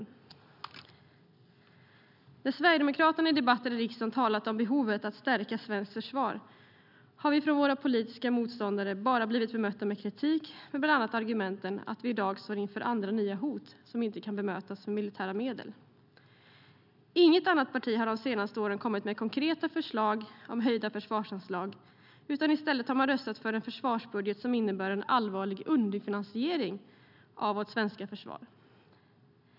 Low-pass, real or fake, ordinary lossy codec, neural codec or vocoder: 5.4 kHz; real; none; none